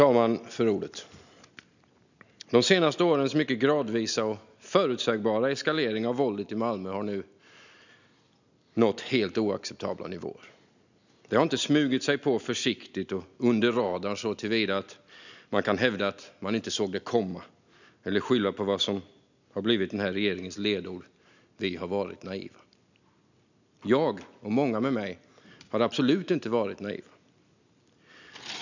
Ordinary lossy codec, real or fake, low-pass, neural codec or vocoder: none; real; 7.2 kHz; none